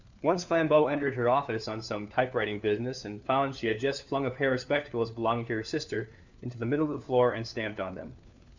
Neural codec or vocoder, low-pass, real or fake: codec, 16 kHz, 4 kbps, FunCodec, trained on LibriTTS, 50 frames a second; 7.2 kHz; fake